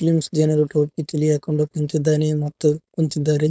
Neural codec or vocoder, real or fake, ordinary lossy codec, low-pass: codec, 16 kHz, 4.8 kbps, FACodec; fake; none; none